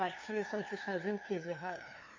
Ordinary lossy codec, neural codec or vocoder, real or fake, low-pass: MP3, 32 kbps; codec, 16 kHz, 2 kbps, FunCodec, trained on LibriTTS, 25 frames a second; fake; 7.2 kHz